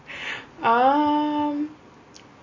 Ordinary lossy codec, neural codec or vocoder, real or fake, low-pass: MP3, 32 kbps; none; real; 7.2 kHz